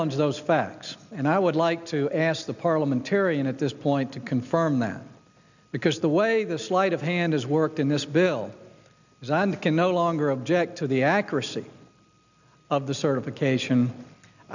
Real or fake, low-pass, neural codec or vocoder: real; 7.2 kHz; none